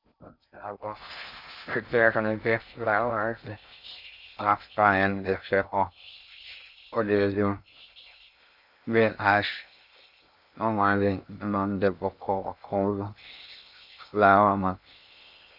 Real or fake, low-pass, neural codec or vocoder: fake; 5.4 kHz; codec, 16 kHz in and 24 kHz out, 0.6 kbps, FocalCodec, streaming, 2048 codes